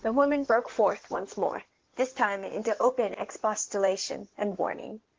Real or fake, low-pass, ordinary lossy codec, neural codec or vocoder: fake; 7.2 kHz; Opus, 16 kbps; codec, 16 kHz in and 24 kHz out, 2.2 kbps, FireRedTTS-2 codec